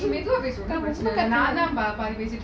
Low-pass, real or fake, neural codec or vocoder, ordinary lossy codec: none; real; none; none